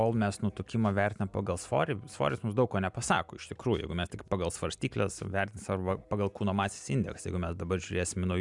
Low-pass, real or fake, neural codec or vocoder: 10.8 kHz; real; none